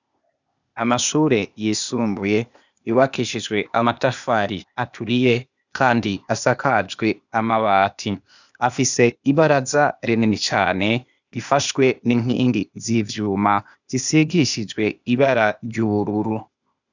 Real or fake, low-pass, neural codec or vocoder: fake; 7.2 kHz; codec, 16 kHz, 0.8 kbps, ZipCodec